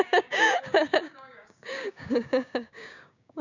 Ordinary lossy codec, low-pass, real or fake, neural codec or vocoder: none; 7.2 kHz; fake; codec, 16 kHz, 6 kbps, DAC